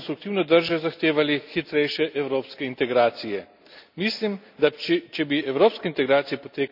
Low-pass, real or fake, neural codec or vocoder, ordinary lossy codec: 5.4 kHz; real; none; none